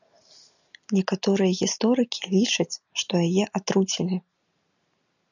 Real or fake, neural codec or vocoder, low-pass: real; none; 7.2 kHz